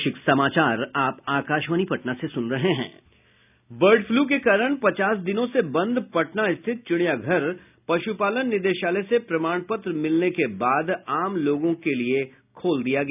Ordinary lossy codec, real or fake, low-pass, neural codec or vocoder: none; real; 3.6 kHz; none